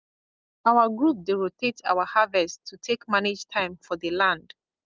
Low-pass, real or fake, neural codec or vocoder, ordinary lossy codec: 7.2 kHz; real; none; Opus, 24 kbps